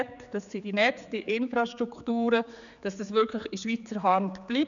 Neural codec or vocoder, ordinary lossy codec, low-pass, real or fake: codec, 16 kHz, 4 kbps, X-Codec, HuBERT features, trained on general audio; none; 7.2 kHz; fake